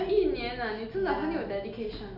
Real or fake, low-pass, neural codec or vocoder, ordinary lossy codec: real; 5.4 kHz; none; none